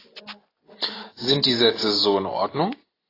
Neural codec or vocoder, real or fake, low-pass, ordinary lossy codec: none; real; 5.4 kHz; AAC, 24 kbps